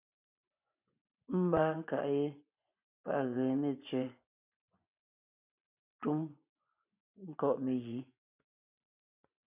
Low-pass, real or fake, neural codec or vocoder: 3.6 kHz; fake; vocoder, 24 kHz, 100 mel bands, Vocos